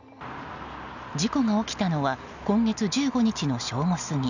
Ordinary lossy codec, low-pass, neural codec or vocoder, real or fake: none; 7.2 kHz; none; real